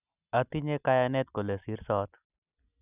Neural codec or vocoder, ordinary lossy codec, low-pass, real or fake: none; none; 3.6 kHz; real